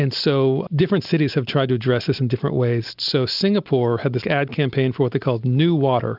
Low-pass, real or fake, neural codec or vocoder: 5.4 kHz; real; none